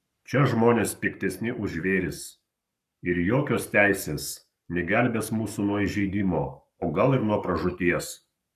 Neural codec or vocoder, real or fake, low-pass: codec, 44.1 kHz, 7.8 kbps, Pupu-Codec; fake; 14.4 kHz